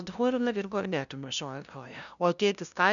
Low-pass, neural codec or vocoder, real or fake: 7.2 kHz; codec, 16 kHz, 0.5 kbps, FunCodec, trained on LibriTTS, 25 frames a second; fake